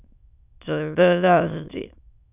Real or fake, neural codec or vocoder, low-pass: fake; autoencoder, 22.05 kHz, a latent of 192 numbers a frame, VITS, trained on many speakers; 3.6 kHz